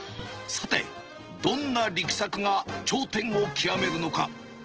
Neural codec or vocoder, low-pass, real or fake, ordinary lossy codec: none; 7.2 kHz; real; Opus, 16 kbps